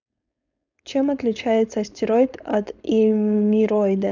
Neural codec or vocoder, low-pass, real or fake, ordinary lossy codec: codec, 16 kHz, 4.8 kbps, FACodec; 7.2 kHz; fake; none